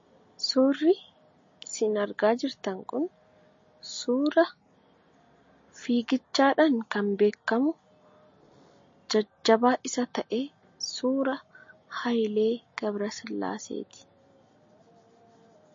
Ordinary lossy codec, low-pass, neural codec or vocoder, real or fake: MP3, 32 kbps; 7.2 kHz; none; real